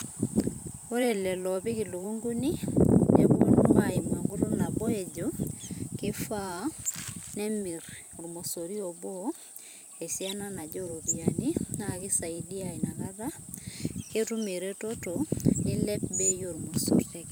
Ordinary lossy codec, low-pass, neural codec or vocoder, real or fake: none; none; vocoder, 44.1 kHz, 128 mel bands every 512 samples, BigVGAN v2; fake